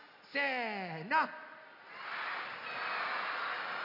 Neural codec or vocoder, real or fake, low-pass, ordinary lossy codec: none; real; 5.4 kHz; none